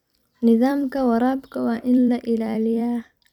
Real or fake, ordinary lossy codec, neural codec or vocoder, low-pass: fake; none; vocoder, 44.1 kHz, 128 mel bands every 256 samples, BigVGAN v2; 19.8 kHz